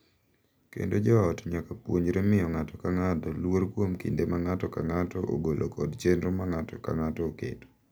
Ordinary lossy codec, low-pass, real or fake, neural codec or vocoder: none; none; real; none